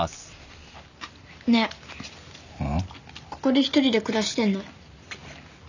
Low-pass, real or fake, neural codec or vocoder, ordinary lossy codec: 7.2 kHz; real; none; none